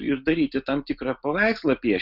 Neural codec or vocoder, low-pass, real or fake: none; 5.4 kHz; real